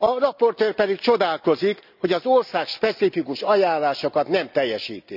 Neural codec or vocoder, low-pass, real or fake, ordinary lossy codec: none; 5.4 kHz; real; none